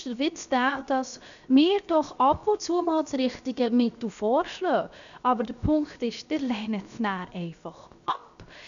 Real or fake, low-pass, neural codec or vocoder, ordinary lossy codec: fake; 7.2 kHz; codec, 16 kHz, 0.7 kbps, FocalCodec; none